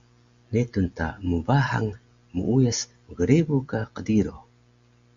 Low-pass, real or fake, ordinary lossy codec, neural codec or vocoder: 7.2 kHz; real; Opus, 64 kbps; none